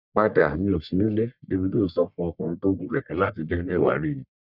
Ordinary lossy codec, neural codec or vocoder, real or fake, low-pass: none; codec, 44.1 kHz, 1.7 kbps, Pupu-Codec; fake; 5.4 kHz